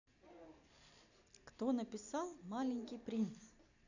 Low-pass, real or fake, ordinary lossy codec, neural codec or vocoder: 7.2 kHz; real; none; none